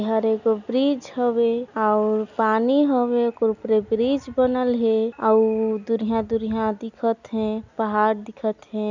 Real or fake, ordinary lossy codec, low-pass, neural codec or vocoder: real; none; 7.2 kHz; none